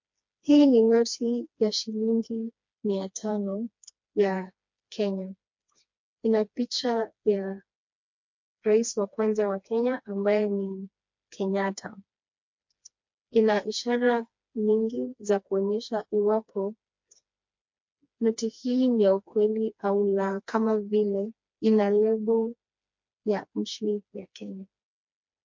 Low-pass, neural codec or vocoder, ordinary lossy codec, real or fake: 7.2 kHz; codec, 16 kHz, 2 kbps, FreqCodec, smaller model; MP3, 48 kbps; fake